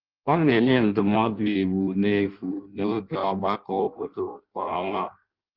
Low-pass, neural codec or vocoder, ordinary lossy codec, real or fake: 5.4 kHz; codec, 16 kHz in and 24 kHz out, 0.6 kbps, FireRedTTS-2 codec; Opus, 32 kbps; fake